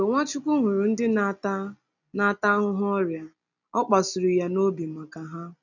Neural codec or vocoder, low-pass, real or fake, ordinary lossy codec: none; 7.2 kHz; real; none